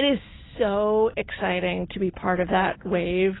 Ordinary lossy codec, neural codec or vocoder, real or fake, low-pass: AAC, 16 kbps; none; real; 7.2 kHz